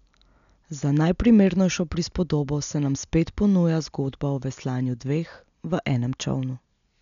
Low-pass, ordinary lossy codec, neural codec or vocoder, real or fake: 7.2 kHz; none; none; real